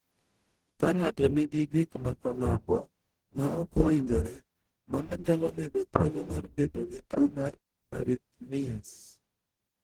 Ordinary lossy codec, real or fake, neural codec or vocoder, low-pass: Opus, 16 kbps; fake; codec, 44.1 kHz, 0.9 kbps, DAC; 19.8 kHz